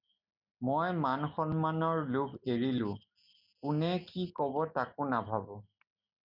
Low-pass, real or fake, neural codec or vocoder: 5.4 kHz; real; none